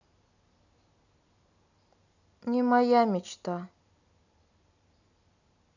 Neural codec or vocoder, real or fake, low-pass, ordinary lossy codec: none; real; 7.2 kHz; none